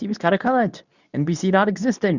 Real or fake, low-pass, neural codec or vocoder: fake; 7.2 kHz; codec, 24 kHz, 0.9 kbps, WavTokenizer, medium speech release version 2